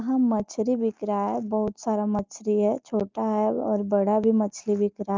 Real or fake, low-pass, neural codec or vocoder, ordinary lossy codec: real; 7.2 kHz; none; Opus, 24 kbps